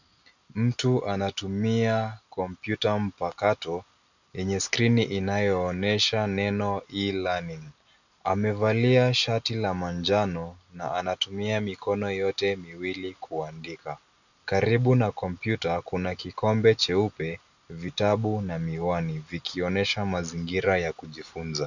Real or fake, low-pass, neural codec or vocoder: real; 7.2 kHz; none